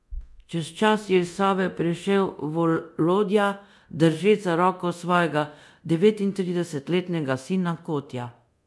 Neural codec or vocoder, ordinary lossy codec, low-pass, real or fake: codec, 24 kHz, 0.9 kbps, DualCodec; none; none; fake